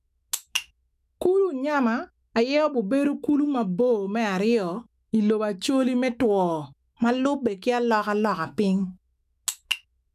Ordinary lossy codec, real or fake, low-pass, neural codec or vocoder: none; fake; 14.4 kHz; autoencoder, 48 kHz, 128 numbers a frame, DAC-VAE, trained on Japanese speech